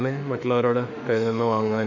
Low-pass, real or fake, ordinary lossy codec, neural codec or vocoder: 7.2 kHz; fake; none; autoencoder, 48 kHz, 32 numbers a frame, DAC-VAE, trained on Japanese speech